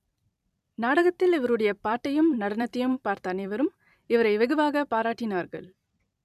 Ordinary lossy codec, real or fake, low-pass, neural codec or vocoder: none; fake; 14.4 kHz; vocoder, 44.1 kHz, 128 mel bands every 256 samples, BigVGAN v2